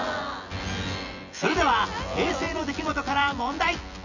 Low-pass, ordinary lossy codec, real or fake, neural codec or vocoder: 7.2 kHz; none; fake; vocoder, 24 kHz, 100 mel bands, Vocos